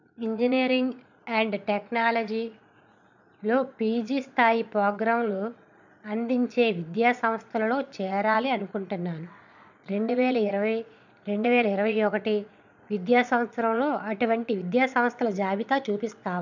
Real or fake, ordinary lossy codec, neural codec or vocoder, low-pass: fake; none; vocoder, 22.05 kHz, 80 mel bands, Vocos; 7.2 kHz